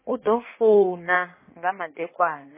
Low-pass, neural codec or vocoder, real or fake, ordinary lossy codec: 3.6 kHz; codec, 16 kHz in and 24 kHz out, 1.1 kbps, FireRedTTS-2 codec; fake; MP3, 16 kbps